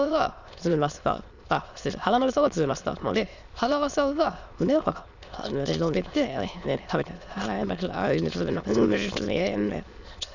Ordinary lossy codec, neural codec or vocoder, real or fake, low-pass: none; autoencoder, 22.05 kHz, a latent of 192 numbers a frame, VITS, trained on many speakers; fake; 7.2 kHz